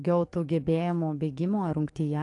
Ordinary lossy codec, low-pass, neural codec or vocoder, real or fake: AAC, 48 kbps; 10.8 kHz; codec, 44.1 kHz, 7.8 kbps, DAC; fake